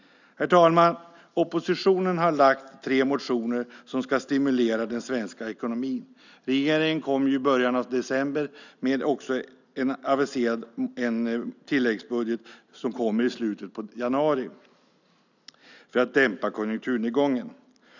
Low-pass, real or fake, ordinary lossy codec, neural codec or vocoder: 7.2 kHz; real; none; none